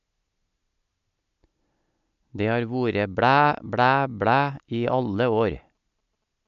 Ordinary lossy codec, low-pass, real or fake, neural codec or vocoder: none; 7.2 kHz; real; none